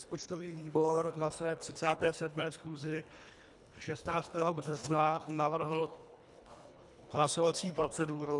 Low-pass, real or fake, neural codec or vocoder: 10.8 kHz; fake; codec, 24 kHz, 1.5 kbps, HILCodec